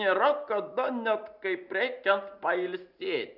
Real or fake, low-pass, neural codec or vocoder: real; 5.4 kHz; none